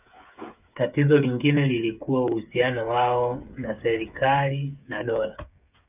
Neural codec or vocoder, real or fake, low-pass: codec, 16 kHz, 8 kbps, FreqCodec, smaller model; fake; 3.6 kHz